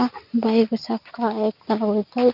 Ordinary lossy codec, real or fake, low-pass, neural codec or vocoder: none; real; 5.4 kHz; none